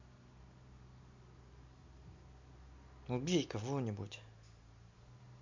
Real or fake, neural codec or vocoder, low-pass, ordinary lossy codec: real; none; 7.2 kHz; MP3, 64 kbps